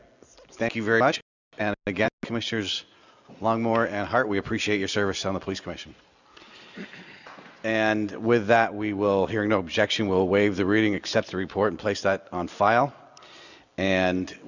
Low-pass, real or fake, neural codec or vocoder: 7.2 kHz; real; none